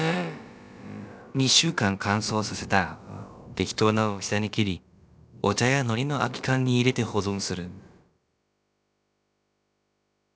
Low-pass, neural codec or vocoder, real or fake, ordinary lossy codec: none; codec, 16 kHz, about 1 kbps, DyCAST, with the encoder's durations; fake; none